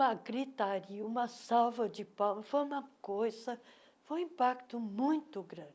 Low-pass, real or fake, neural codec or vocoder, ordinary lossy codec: none; real; none; none